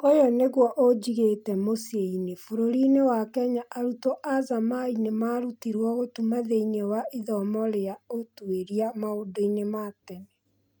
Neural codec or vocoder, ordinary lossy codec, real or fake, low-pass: none; none; real; none